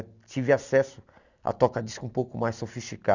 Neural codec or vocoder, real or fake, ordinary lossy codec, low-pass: none; real; none; 7.2 kHz